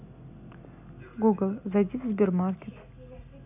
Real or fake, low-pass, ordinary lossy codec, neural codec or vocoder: real; 3.6 kHz; none; none